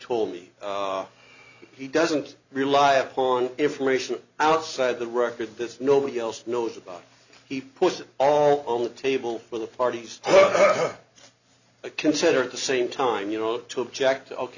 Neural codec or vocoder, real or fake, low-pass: none; real; 7.2 kHz